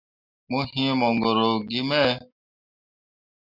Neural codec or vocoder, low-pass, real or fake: none; 5.4 kHz; real